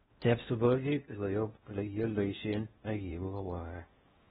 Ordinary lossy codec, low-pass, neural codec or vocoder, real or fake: AAC, 16 kbps; 10.8 kHz; codec, 16 kHz in and 24 kHz out, 0.6 kbps, FocalCodec, streaming, 4096 codes; fake